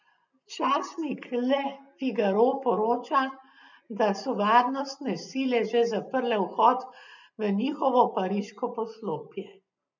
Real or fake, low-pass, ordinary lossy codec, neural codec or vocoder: real; 7.2 kHz; none; none